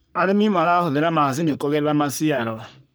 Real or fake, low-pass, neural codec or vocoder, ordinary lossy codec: fake; none; codec, 44.1 kHz, 3.4 kbps, Pupu-Codec; none